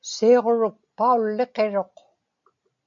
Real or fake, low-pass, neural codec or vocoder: real; 7.2 kHz; none